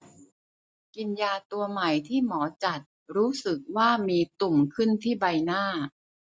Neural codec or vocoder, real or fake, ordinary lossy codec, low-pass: none; real; none; none